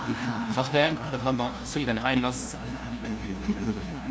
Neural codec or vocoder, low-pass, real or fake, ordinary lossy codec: codec, 16 kHz, 0.5 kbps, FunCodec, trained on LibriTTS, 25 frames a second; none; fake; none